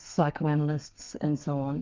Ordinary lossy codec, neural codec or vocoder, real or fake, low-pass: Opus, 24 kbps; codec, 32 kHz, 1.9 kbps, SNAC; fake; 7.2 kHz